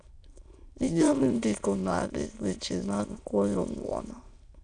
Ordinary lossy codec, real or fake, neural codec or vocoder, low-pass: none; fake; autoencoder, 22.05 kHz, a latent of 192 numbers a frame, VITS, trained on many speakers; 9.9 kHz